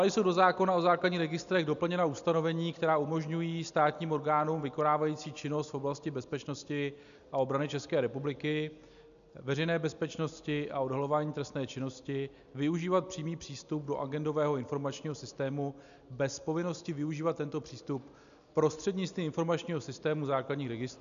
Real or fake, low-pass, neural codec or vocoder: real; 7.2 kHz; none